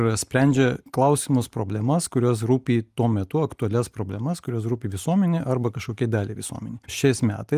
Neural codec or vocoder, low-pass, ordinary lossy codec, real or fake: none; 14.4 kHz; Opus, 32 kbps; real